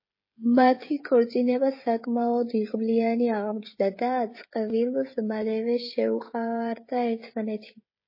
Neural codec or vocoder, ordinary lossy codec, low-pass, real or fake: codec, 16 kHz, 16 kbps, FreqCodec, smaller model; MP3, 24 kbps; 5.4 kHz; fake